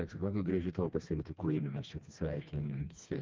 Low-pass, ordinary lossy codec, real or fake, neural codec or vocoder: 7.2 kHz; Opus, 24 kbps; fake; codec, 16 kHz, 2 kbps, FreqCodec, smaller model